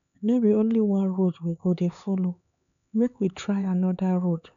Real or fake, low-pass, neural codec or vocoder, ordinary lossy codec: fake; 7.2 kHz; codec, 16 kHz, 4 kbps, X-Codec, HuBERT features, trained on LibriSpeech; none